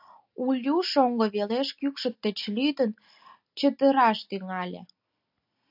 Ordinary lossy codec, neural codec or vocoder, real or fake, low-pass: AAC, 48 kbps; none; real; 5.4 kHz